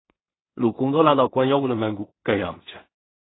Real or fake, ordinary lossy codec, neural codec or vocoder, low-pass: fake; AAC, 16 kbps; codec, 16 kHz in and 24 kHz out, 0.4 kbps, LongCat-Audio-Codec, two codebook decoder; 7.2 kHz